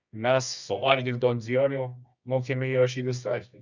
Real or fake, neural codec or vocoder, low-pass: fake; codec, 24 kHz, 0.9 kbps, WavTokenizer, medium music audio release; 7.2 kHz